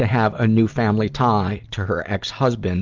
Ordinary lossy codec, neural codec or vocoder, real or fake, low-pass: Opus, 32 kbps; none; real; 7.2 kHz